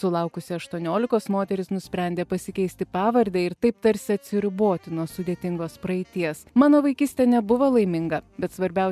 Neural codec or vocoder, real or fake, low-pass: none; real; 14.4 kHz